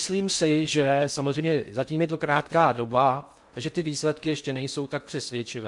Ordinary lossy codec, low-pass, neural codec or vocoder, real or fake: MP3, 64 kbps; 10.8 kHz; codec, 16 kHz in and 24 kHz out, 0.6 kbps, FocalCodec, streaming, 4096 codes; fake